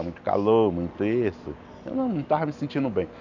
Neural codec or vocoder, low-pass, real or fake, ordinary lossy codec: none; 7.2 kHz; real; none